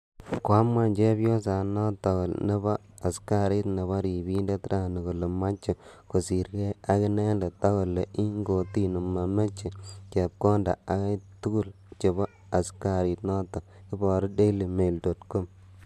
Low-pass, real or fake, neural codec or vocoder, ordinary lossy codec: 14.4 kHz; real; none; MP3, 96 kbps